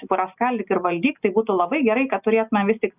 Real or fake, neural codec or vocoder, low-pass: real; none; 3.6 kHz